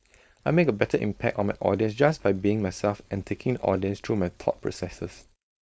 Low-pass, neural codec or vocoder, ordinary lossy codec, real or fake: none; codec, 16 kHz, 4.8 kbps, FACodec; none; fake